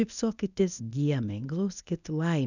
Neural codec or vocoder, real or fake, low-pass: codec, 24 kHz, 0.9 kbps, WavTokenizer, medium speech release version 1; fake; 7.2 kHz